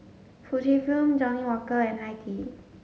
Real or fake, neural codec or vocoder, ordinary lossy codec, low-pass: real; none; none; none